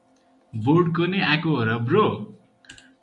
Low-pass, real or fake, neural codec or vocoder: 10.8 kHz; real; none